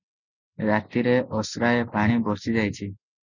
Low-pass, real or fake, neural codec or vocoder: 7.2 kHz; real; none